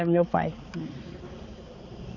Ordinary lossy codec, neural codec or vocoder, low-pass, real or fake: none; codec, 16 kHz, 4 kbps, X-Codec, HuBERT features, trained on balanced general audio; 7.2 kHz; fake